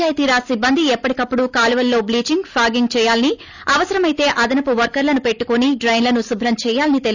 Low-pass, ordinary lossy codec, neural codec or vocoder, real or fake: 7.2 kHz; none; none; real